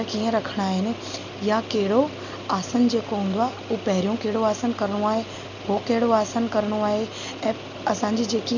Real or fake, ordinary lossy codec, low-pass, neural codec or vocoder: real; none; 7.2 kHz; none